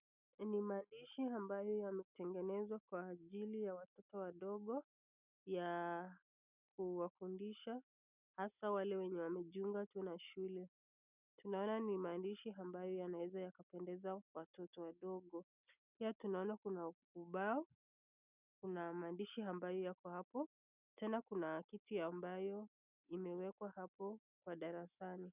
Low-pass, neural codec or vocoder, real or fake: 3.6 kHz; none; real